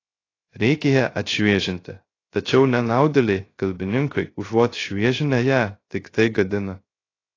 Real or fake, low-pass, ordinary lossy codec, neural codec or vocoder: fake; 7.2 kHz; AAC, 32 kbps; codec, 16 kHz, 0.3 kbps, FocalCodec